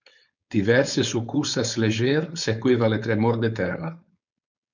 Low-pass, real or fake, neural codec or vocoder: 7.2 kHz; fake; codec, 16 kHz, 4.8 kbps, FACodec